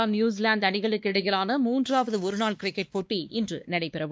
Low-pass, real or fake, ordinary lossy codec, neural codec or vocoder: none; fake; none; codec, 16 kHz, 2 kbps, X-Codec, WavLM features, trained on Multilingual LibriSpeech